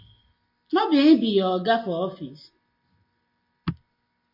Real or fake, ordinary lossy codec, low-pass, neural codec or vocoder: real; MP3, 32 kbps; 5.4 kHz; none